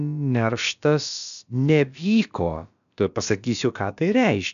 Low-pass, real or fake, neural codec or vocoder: 7.2 kHz; fake; codec, 16 kHz, about 1 kbps, DyCAST, with the encoder's durations